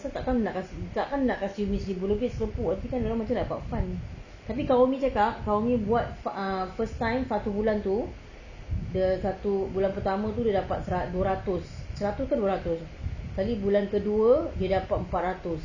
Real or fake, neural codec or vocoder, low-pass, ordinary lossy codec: real; none; 7.2 kHz; none